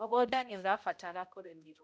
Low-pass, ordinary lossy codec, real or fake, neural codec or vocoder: none; none; fake; codec, 16 kHz, 0.5 kbps, X-Codec, HuBERT features, trained on balanced general audio